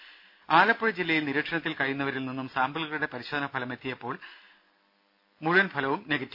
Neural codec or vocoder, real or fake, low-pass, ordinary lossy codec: none; real; 5.4 kHz; AAC, 48 kbps